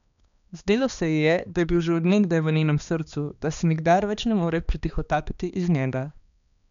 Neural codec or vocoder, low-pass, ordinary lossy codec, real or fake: codec, 16 kHz, 2 kbps, X-Codec, HuBERT features, trained on balanced general audio; 7.2 kHz; none; fake